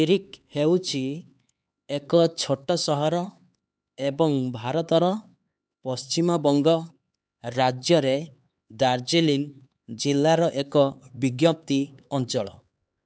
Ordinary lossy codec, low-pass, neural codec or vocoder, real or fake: none; none; codec, 16 kHz, 4 kbps, X-Codec, HuBERT features, trained on LibriSpeech; fake